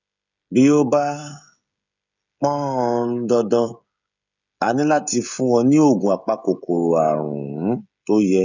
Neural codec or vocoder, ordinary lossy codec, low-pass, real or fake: codec, 16 kHz, 16 kbps, FreqCodec, smaller model; none; 7.2 kHz; fake